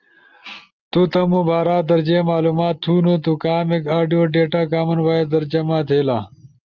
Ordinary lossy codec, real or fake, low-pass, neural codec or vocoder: Opus, 24 kbps; real; 7.2 kHz; none